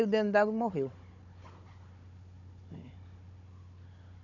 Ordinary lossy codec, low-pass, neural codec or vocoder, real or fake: none; 7.2 kHz; codec, 16 kHz, 16 kbps, FunCodec, trained on Chinese and English, 50 frames a second; fake